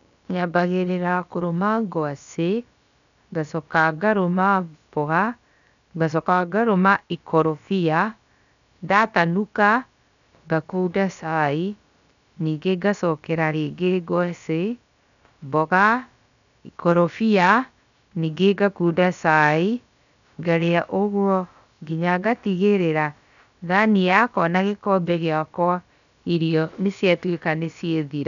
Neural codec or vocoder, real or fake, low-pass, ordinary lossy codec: codec, 16 kHz, about 1 kbps, DyCAST, with the encoder's durations; fake; 7.2 kHz; none